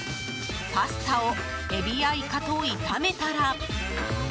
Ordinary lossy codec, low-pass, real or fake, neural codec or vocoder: none; none; real; none